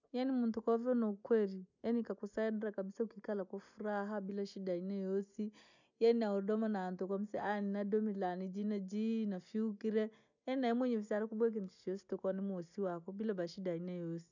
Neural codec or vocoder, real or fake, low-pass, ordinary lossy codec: none; real; 7.2 kHz; none